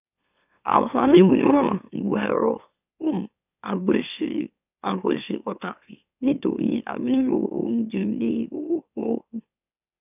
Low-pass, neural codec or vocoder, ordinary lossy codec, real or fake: 3.6 kHz; autoencoder, 44.1 kHz, a latent of 192 numbers a frame, MeloTTS; none; fake